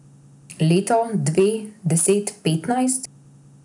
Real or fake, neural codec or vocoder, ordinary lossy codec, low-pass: real; none; none; 10.8 kHz